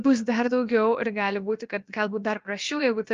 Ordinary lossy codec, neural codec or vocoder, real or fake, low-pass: Opus, 32 kbps; codec, 16 kHz, about 1 kbps, DyCAST, with the encoder's durations; fake; 7.2 kHz